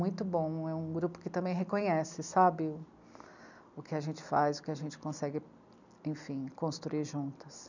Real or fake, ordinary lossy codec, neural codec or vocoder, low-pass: real; none; none; 7.2 kHz